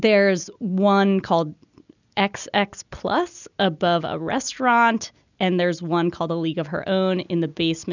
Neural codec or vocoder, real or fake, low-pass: none; real; 7.2 kHz